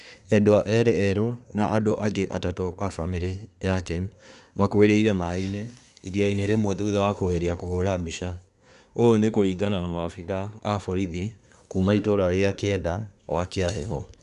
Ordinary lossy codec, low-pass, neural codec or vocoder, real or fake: none; 10.8 kHz; codec, 24 kHz, 1 kbps, SNAC; fake